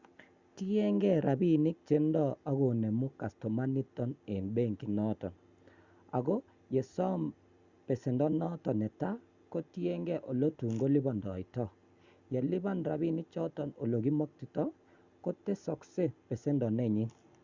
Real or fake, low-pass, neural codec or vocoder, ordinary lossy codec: real; 7.2 kHz; none; Opus, 64 kbps